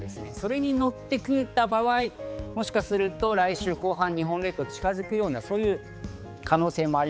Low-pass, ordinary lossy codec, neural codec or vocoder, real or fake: none; none; codec, 16 kHz, 4 kbps, X-Codec, HuBERT features, trained on balanced general audio; fake